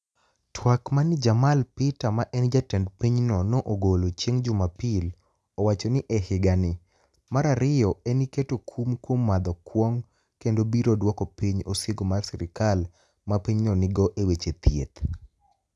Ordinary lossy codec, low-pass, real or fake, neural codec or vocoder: none; none; real; none